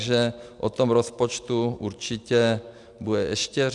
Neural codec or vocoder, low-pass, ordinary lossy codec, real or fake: none; 10.8 kHz; MP3, 96 kbps; real